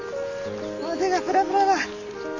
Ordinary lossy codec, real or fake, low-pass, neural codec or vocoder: none; real; 7.2 kHz; none